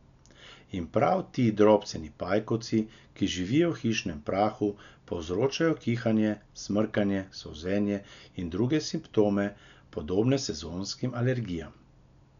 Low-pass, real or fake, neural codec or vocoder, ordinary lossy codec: 7.2 kHz; real; none; none